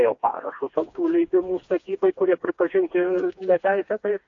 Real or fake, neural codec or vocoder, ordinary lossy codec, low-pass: fake; codec, 16 kHz, 2 kbps, FreqCodec, smaller model; AAC, 48 kbps; 7.2 kHz